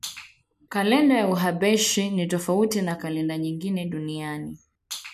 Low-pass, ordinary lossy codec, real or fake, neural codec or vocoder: 14.4 kHz; none; real; none